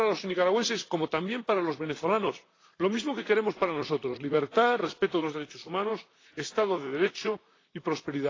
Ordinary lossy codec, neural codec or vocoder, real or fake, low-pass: AAC, 32 kbps; vocoder, 44.1 kHz, 128 mel bands, Pupu-Vocoder; fake; 7.2 kHz